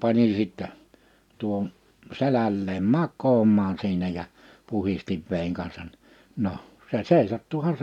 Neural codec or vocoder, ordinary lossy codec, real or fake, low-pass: none; none; real; 19.8 kHz